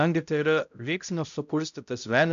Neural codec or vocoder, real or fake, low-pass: codec, 16 kHz, 0.5 kbps, X-Codec, HuBERT features, trained on balanced general audio; fake; 7.2 kHz